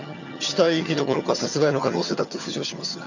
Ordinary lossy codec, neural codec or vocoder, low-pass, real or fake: none; vocoder, 22.05 kHz, 80 mel bands, HiFi-GAN; 7.2 kHz; fake